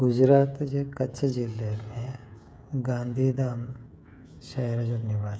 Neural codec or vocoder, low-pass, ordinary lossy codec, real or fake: codec, 16 kHz, 16 kbps, FreqCodec, smaller model; none; none; fake